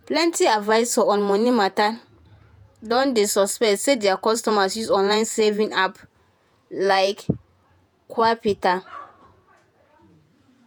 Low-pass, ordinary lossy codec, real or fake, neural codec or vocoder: none; none; fake; vocoder, 48 kHz, 128 mel bands, Vocos